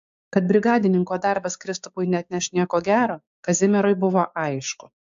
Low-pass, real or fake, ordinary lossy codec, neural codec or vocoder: 7.2 kHz; fake; AAC, 64 kbps; codec, 16 kHz, 6 kbps, DAC